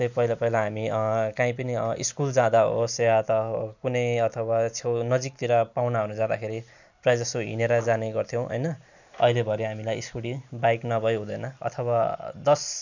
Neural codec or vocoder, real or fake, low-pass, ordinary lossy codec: none; real; 7.2 kHz; none